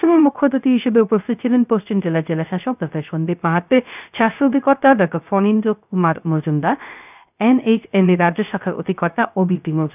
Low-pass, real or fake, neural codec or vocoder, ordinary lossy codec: 3.6 kHz; fake; codec, 16 kHz, 0.3 kbps, FocalCodec; none